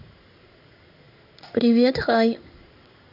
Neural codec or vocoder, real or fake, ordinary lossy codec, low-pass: codec, 16 kHz in and 24 kHz out, 1 kbps, XY-Tokenizer; fake; none; 5.4 kHz